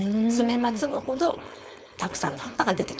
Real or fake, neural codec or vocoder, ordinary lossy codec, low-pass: fake; codec, 16 kHz, 4.8 kbps, FACodec; none; none